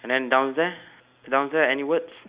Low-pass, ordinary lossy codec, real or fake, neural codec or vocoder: 3.6 kHz; Opus, 64 kbps; real; none